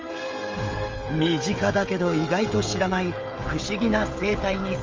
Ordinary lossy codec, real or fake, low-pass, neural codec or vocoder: Opus, 32 kbps; fake; 7.2 kHz; codec, 16 kHz, 16 kbps, FreqCodec, smaller model